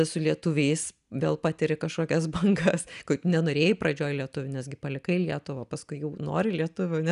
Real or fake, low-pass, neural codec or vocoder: real; 10.8 kHz; none